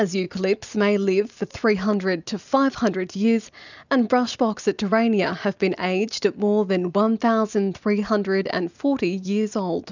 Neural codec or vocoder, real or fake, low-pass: none; real; 7.2 kHz